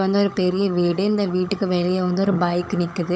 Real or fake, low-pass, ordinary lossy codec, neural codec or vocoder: fake; none; none; codec, 16 kHz, 16 kbps, FunCodec, trained on LibriTTS, 50 frames a second